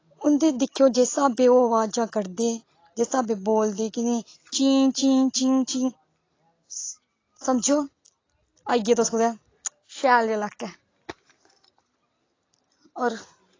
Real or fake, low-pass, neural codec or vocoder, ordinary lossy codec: real; 7.2 kHz; none; AAC, 32 kbps